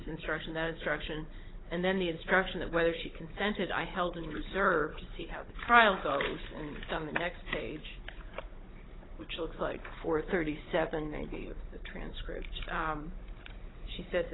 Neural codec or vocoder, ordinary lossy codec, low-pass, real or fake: codec, 16 kHz, 16 kbps, FunCodec, trained on Chinese and English, 50 frames a second; AAC, 16 kbps; 7.2 kHz; fake